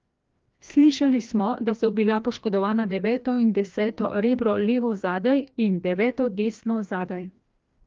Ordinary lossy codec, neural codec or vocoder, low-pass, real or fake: Opus, 32 kbps; codec, 16 kHz, 1 kbps, FreqCodec, larger model; 7.2 kHz; fake